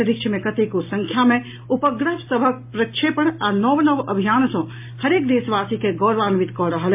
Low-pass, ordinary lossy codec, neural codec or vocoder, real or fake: 3.6 kHz; MP3, 32 kbps; none; real